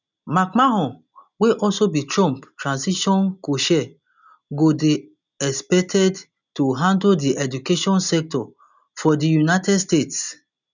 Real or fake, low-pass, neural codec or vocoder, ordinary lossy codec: real; 7.2 kHz; none; none